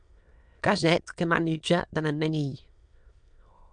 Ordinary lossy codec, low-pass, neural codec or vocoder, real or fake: MP3, 64 kbps; 9.9 kHz; autoencoder, 22.05 kHz, a latent of 192 numbers a frame, VITS, trained on many speakers; fake